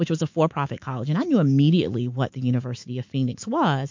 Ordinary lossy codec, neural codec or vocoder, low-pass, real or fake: MP3, 48 kbps; autoencoder, 48 kHz, 128 numbers a frame, DAC-VAE, trained on Japanese speech; 7.2 kHz; fake